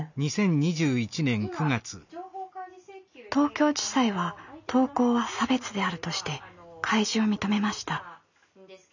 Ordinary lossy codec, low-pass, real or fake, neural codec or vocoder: none; 7.2 kHz; real; none